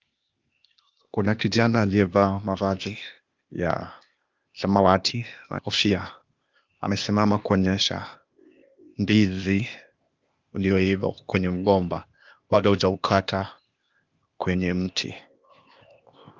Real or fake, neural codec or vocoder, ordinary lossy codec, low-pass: fake; codec, 16 kHz, 0.8 kbps, ZipCodec; Opus, 24 kbps; 7.2 kHz